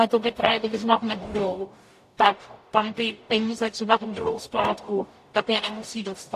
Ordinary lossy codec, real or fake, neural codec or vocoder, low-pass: AAC, 64 kbps; fake; codec, 44.1 kHz, 0.9 kbps, DAC; 14.4 kHz